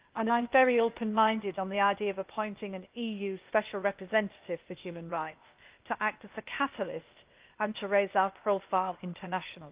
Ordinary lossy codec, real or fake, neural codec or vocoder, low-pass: Opus, 32 kbps; fake; codec, 16 kHz, 0.8 kbps, ZipCodec; 3.6 kHz